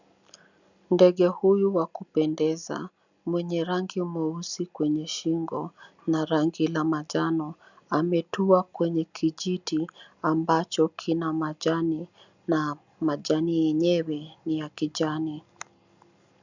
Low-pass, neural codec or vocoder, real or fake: 7.2 kHz; none; real